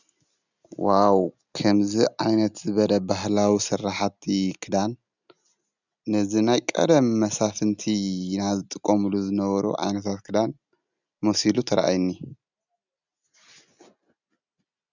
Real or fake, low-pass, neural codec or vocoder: real; 7.2 kHz; none